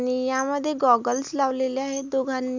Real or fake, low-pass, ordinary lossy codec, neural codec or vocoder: real; 7.2 kHz; none; none